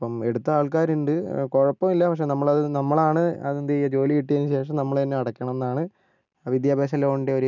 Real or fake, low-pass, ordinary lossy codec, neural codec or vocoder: real; 7.2 kHz; none; none